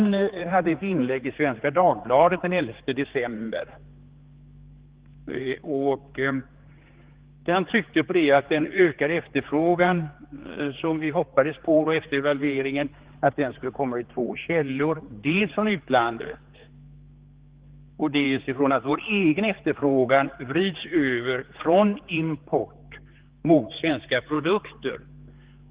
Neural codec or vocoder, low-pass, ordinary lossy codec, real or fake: codec, 16 kHz, 4 kbps, X-Codec, HuBERT features, trained on general audio; 3.6 kHz; Opus, 16 kbps; fake